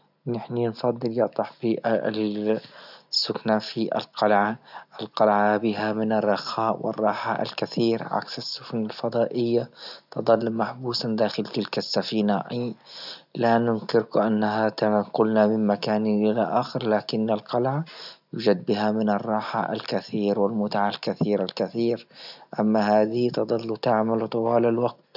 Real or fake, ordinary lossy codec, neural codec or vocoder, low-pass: real; none; none; 5.4 kHz